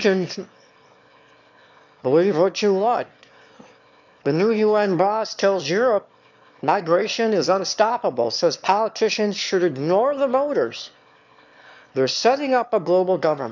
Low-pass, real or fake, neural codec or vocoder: 7.2 kHz; fake; autoencoder, 22.05 kHz, a latent of 192 numbers a frame, VITS, trained on one speaker